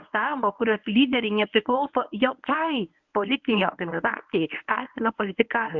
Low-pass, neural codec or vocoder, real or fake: 7.2 kHz; codec, 24 kHz, 0.9 kbps, WavTokenizer, medium speech release version 1; fake